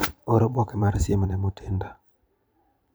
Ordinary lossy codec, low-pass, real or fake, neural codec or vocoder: none; none; fake; vocoder, 44.1 kHz, 128 mel bands every 512 samples, BigVGAN v2